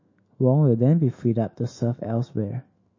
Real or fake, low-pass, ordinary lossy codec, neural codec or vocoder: fake; 7.2 kHz; MP3, 32 kbps; autoencoder, 48 kHz, 128 numbers a frame, DAC-VAE, trained on Japanese speech